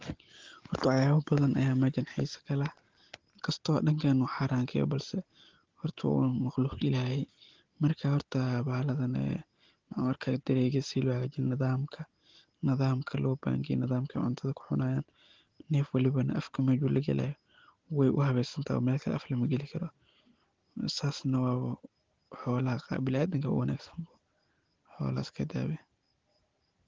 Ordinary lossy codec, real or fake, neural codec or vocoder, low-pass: Opus, 16 kbps; real; none; 7.2 kHz